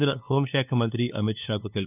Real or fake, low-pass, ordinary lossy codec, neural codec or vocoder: fake; 3.6 kHz; none; codec, 16 kHz, 2 kbps, FunCodec, trained on LibriTTS, 25 frames a second